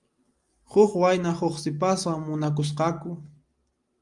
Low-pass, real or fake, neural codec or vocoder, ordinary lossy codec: 10.8 kHz; real; none; Opus, 32 kbps